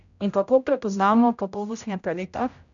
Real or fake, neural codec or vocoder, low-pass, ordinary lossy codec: fake; codec, 16 kHz, 0.5 kbps, X-Codec, HuBERT features, trained on general audio; 7.2 kHz; MP3, 96 kbps